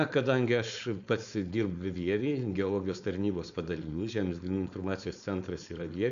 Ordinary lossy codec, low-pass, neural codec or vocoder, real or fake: AAC, 96 kbps; 7.2 kHz; codec, 16 kHz, 4.8 kbps, FACodec; fake